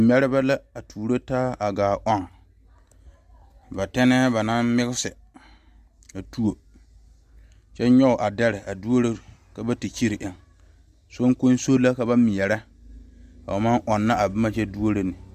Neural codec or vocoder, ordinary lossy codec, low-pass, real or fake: none; Opus, 64 kbps; 14.4 kHz; real